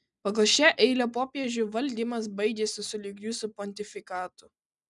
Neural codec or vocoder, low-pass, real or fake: none; 10.8 kHz; real